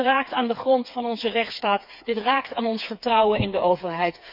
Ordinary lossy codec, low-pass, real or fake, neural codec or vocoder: none; 5.4 kHz; fake; codec, 16 kHz, 4 kbps, FreqCodec, smaller model